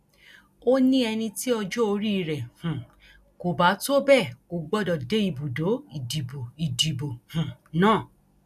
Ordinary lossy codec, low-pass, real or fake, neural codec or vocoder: none; 14.4 kHz; real; none